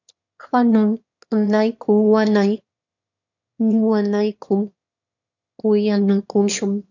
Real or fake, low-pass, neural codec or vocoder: fake; 7.2 kHz; autoencoder, 22.05 kHz, a latent of 192 numbers a frame, VITS, trained on one speaker